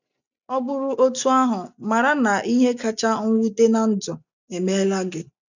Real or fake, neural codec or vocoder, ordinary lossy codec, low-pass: real; none; none; 7.2 kHz